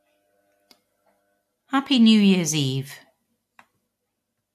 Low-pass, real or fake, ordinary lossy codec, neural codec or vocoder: 14.4 kHz; real; MP3, 64 kbps; none